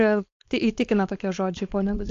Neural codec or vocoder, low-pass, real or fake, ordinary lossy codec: codec, 16 kHz, 4.8 kbps, FACodec; 7.2 kHz; fake; AAC, 48 kbps